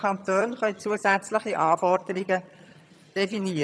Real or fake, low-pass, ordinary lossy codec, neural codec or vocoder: fake; none; none; vocoder, 22.05 kHz, 80 mel bands, HiFi-GAN